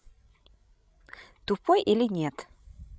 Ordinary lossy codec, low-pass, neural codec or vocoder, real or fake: none; none; codec, 16 kHz, 16 kbps, FreqCodec, larger model; fake